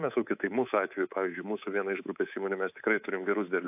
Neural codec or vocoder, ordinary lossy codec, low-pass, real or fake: codec, 24 kHz, 3.1 kbps, DualCodec; AAC, 32 kbps; 3.6 kHz; fake